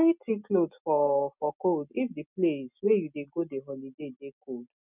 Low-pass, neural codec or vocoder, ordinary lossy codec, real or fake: 3.6 kHz; none; none; real